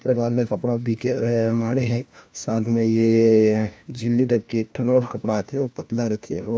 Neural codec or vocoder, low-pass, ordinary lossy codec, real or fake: codec, 16 kHz, 1 kbps, FunCodec, trained on LibriTTS, 50 frames a second; none; none; fake